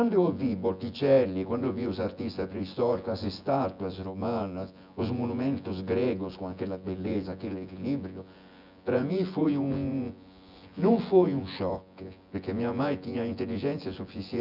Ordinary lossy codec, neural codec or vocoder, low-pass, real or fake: none; vocoder, 24 kHz, 100 mel bands, Vocos; 5.4 kHz; fake